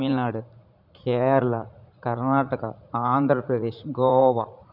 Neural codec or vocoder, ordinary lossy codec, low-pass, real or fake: vocoder, 44.1 kHz, 80 mel bands, Vocos; none; 5.4 kHz; fake